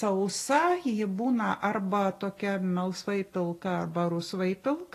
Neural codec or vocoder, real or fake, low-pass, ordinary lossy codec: none; real; 14.4 kHz; AAC, 48 kbps